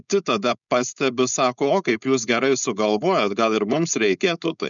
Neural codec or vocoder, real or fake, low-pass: codec, 16 kHz, 4.8 kbps, FACodec; fake; 7.2 kHz